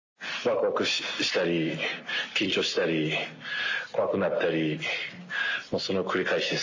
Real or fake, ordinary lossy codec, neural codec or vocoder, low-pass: real; AAC, 48 kbps; none; 7.2 kHz